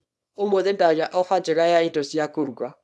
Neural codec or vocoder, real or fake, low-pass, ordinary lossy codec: codec, 24 kHz, 0.9 kbps, WavTokenizer, small release; fake; none; none